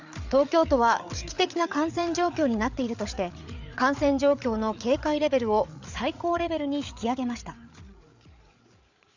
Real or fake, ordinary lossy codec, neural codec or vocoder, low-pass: fake; none; codec, 16 kHz, 8 kbps, FreqCodec, larger model; 7.2 kHz